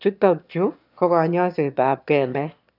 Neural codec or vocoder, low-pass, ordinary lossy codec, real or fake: autoencoder, 22.05 kHz, a latent of 192 numbers a frame, VITS, trained on one speaker; 5.4 kHz; none; fake